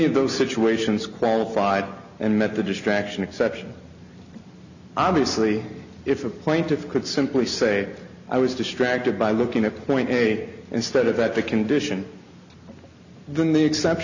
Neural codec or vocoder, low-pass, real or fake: none; 7.2 kHz; real